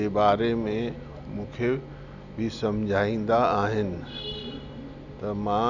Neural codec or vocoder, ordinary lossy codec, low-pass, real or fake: none; none; 7.2 kHz; real